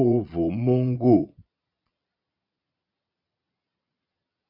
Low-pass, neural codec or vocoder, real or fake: 5.4 kHz; vocoder, 22.05 kHz, 80 mel bands, Vocos; fake